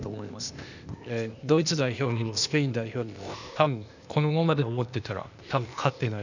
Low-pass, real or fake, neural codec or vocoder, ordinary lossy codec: 7.2 kHz; fake; codec, 16 kHz, 0.8 kbps, ZipCodec; none